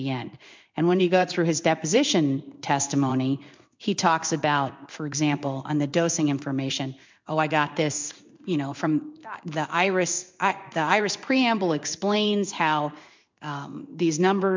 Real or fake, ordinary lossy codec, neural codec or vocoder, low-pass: fake; MP3, 64 kbps; codec, 16 kHz in and 24 kHz out, 1 kbps, XY-Tokenizer; 7.2 kHz